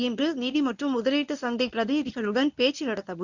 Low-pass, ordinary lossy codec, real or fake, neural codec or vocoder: 7.2 kHz; none; fake; codec, 24 kHz, 0.9 kbps, WavTokenizer, medium speech release version 1